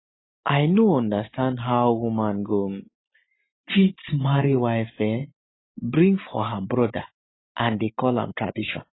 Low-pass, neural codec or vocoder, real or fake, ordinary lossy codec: 7.2 kHz; none; real; AAC, 16 kbps